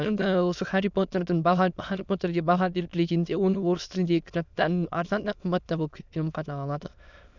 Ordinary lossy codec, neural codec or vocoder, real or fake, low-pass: none; autoencoder, 22.05 kHz, a latent of 192 numbers a frame, VITS, trained on many speakers; fake; 7.2 kHz